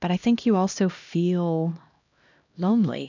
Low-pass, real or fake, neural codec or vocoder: 7.2 kHz; fake; codec, 16 kHz, 1 kbps, X-Codec, HuBERT features, trained on LibriSpeech